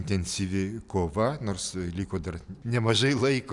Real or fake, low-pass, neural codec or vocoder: real; 10.8 kHz; none